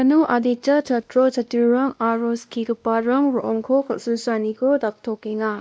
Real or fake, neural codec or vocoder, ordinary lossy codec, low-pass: fake; codec, 16 kHz, 1 kbps, X-Codec, WavLM features, trained on Multilingual LibriSpeech; none; none